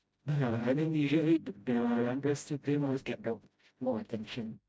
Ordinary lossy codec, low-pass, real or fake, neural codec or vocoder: none; none; fake; codec, 16 kHz, 0.5 kbps, FreqCodec, smaller model